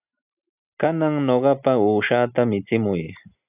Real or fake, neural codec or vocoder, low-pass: real; none; 3.6 kHz